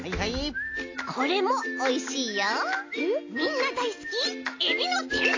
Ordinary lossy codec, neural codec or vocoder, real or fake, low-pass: AAC, 32 kbps; none; real; 7.2 kHz